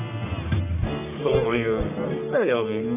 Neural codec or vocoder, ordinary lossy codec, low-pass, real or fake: codec, 44.1 kHz, 1.7 kbps, Pupu-Codec; none; 3.6 kHz; fake